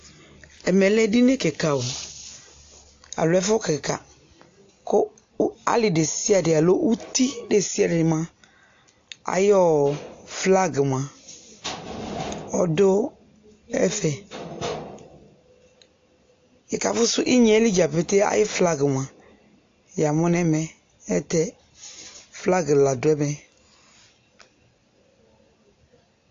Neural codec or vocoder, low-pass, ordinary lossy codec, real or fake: none; 7.2 kHz; AAC, 48 kbps; real